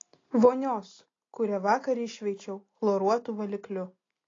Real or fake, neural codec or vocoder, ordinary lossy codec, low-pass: real; none; AAC, 32 kbps; 7.2 kHz